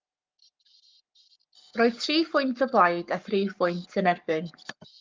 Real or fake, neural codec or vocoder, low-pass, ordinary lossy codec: fake; codec, 44.1 kHz, 7.8 kbps, Pupu-Codec; 7.2 kHz; Opus, 24 kbps